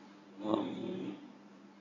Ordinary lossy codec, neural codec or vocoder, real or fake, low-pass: none; codec, 24 kHz, 0.9 kbps, WavTokenizer, medium speech release version 1; fake; 7.2 kHz